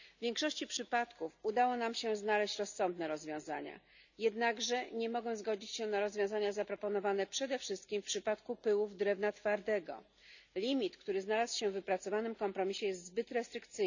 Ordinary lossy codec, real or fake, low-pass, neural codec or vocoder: none; real; 7.2 kHz; none